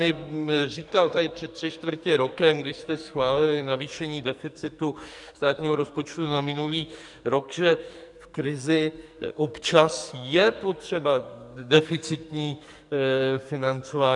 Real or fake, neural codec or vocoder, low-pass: fake; codec, 44.1 kHz, 2.6 kbps, SNAC; 10.8 kHz